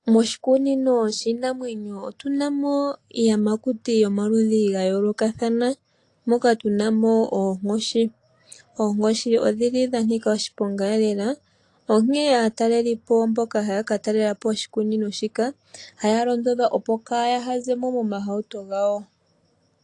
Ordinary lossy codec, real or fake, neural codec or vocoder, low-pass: AAC, 48 kbps; real; none; 10.8 kHz